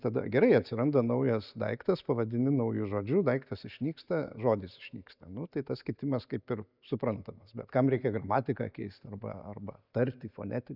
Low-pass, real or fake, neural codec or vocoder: 5.4 kHz; real; none